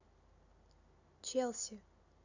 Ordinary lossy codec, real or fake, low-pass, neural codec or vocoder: none; real; 7.2 kHz; none